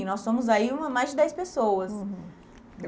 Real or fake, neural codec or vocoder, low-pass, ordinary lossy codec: real; none; none; none